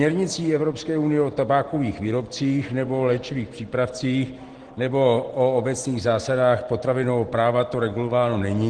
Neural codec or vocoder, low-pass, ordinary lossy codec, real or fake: none; 9.9 kHz; Opus, 16 kbps; real